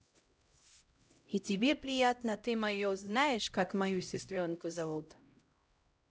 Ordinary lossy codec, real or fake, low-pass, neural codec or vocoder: none; fake; none; codec, 16 kHz, 0.5 kbps, X-Codec, HuBERT features, trained on LibriSpeech